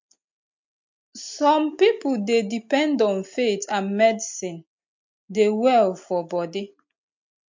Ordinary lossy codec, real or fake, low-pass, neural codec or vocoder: MP3, 48 kbps; real; 7.2 kHz; none